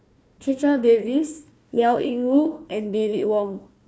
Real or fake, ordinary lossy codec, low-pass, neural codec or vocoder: fake; none; none; codec, 16 kHz, 1 kbps, FunCodec, trained on Chinese and English, 50 frames a second